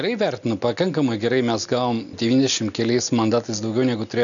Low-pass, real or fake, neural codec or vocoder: 7.2 kHz; real; none